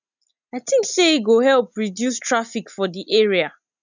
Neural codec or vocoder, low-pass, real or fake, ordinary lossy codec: none; 7.2 kHz; real; none